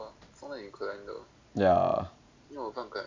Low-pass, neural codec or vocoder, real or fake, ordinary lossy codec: 7.2 kHz; none; real; MP3, 64 kbps